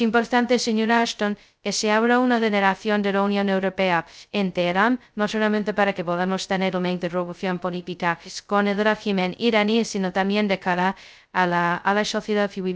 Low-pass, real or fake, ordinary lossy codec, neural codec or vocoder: none; fake; none; codec, 16 kHz, 0.2 kbps, FocalCodec